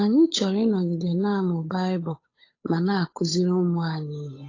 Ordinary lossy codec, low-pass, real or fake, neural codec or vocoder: AAC, 32 kbps; 7.2 kHz; fake; codec, 16 kHz, 8 kbps, FunCodec, trained on Chinese and English, 25 frames a second